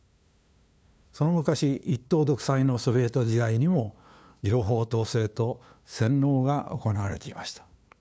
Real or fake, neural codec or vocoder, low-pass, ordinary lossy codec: fake; codec, 16 kHz, 2 kbps, FunCodec, trained on LibriTTS, 25 frames a second; none; none